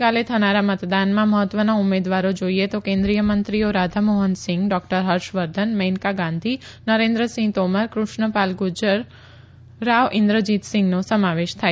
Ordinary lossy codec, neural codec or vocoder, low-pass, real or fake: none; none; none; real